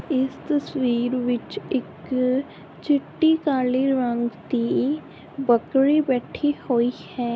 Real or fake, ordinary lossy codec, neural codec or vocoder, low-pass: real; none; none; none